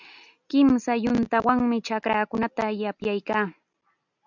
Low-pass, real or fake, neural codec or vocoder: 7.2 kHz; real; none